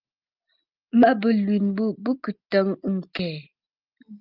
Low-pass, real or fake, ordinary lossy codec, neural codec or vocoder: 5.4 kHz; real; Opus, 24 kbps; none